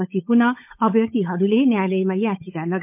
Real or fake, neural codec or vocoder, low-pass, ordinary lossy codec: fake; codec, 16 kHz, 16 kbps, FunCodec, trained on LibriTTS, 50 frames a second; 3.6 kHz; MP3, 32 kbps